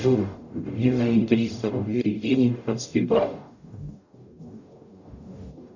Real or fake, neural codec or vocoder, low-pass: fake; codec, 44.1 kHz, 0.9 kbps, DAC; 7.2 kHz